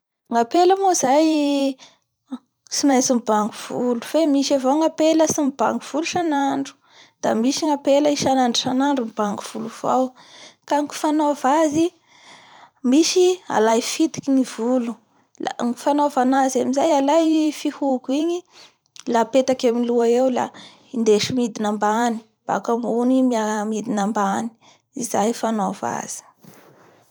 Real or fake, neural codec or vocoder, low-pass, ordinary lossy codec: fake; vocoder, 44.1 kHz, 128 mel bands every 512 samples, BigVGAN v2; none; none